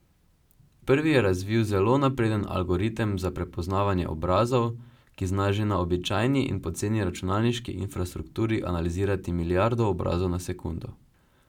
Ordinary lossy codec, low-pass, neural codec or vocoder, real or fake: none; 19.8 kHz; none; real